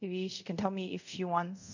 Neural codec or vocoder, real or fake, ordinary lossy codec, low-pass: codec, 24 kHz, 0.5 kbps, DualCodec; fake; none; 7.2 kHz